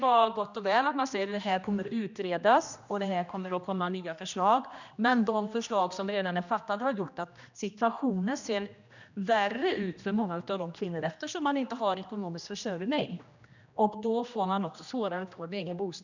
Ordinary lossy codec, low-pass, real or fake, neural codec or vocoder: none; 7.2 kHz; fake; codec, 16 kHz, 1 kbps, X-Codec, HuBERT features, trained on general audio